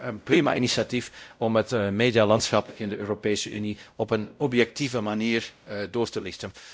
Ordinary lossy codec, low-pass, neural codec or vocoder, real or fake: none; none; codec, 16 kHz, 0.5 kbps, X-Codec, WavLM features, trained on Multilingual LibriSpeech; fake